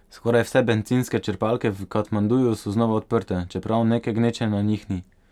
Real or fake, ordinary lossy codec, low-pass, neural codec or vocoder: real; none; 19.8 kHz; none